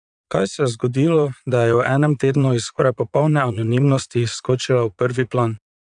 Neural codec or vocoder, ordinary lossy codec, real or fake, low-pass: vocoder, 22.05 kHz, 80 mel bands, Vocos; none; fake; 9.9 kHz